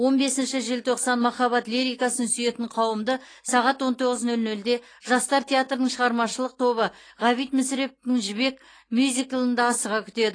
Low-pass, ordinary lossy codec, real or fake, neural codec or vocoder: 9.9 kHz; AAC, 32 kbps; real; none